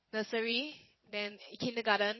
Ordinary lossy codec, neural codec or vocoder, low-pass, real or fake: MP3, 24 kbps; vocoder, 22.05 kHz, 80 mel bands, WaveNeXt; 7.2 kHz; fake